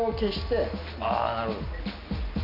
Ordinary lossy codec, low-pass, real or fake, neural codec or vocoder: none; 5.4 kHz; real; none